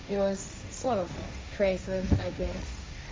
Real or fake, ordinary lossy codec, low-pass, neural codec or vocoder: fake; none; none; codec, 16 kHz, 1.1 kbps, Voila-Tokenizer